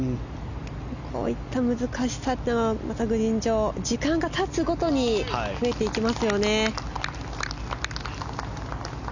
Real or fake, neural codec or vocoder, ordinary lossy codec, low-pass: real; none; none; 7.2 kHz